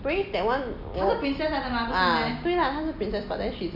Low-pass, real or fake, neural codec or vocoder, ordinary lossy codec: 5.4 kHz; real; none; none